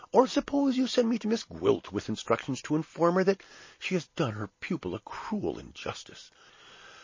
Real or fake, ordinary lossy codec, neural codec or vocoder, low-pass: real; MP3, 32 kbps; none; 7.2 kHz